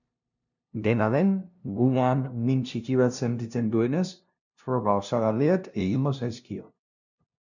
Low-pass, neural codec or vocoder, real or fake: 7.2 kHz; codec, 16 kHz, 0.5 kbps, FunCodec, trained on LibriTTS, 25 frames a second; fake